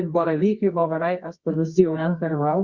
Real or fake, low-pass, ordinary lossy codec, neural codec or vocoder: fake; 7.2 kHz; Opus, 64 kbps; codec, 24 kHz, 0.9 kbps, WavTokenizer, medium music audio release